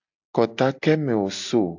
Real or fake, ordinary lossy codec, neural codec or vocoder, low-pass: real; AAC, 48 kbps; none; 7.2 kHz